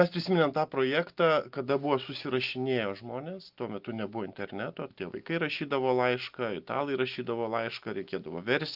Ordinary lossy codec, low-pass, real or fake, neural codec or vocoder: Opus, 24 kbps; 5.4 kHz; real; none